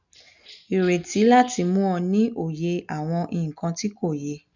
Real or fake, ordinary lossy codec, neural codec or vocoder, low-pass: real; none; none; 7.2 kHz